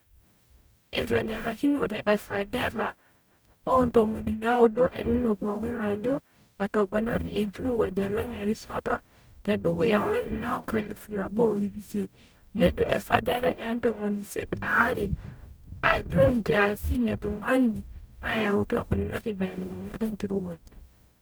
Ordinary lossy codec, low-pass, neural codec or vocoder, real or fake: none; none; codec, 44.1 kHz, 0.9 kbps, DAC; fake